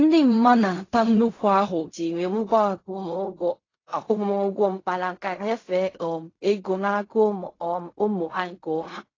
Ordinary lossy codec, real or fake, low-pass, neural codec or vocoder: AAC, 32 kbps; fake; 7.2 kHz; codec, 16 kHz in and 24 kHz out, 0.4 kbps, LongCat-Audio-Codec, fine tuned four codebook decoder